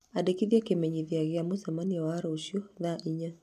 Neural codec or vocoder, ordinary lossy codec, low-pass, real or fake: none; MP3, 96 kbps; 14.4 kHz; real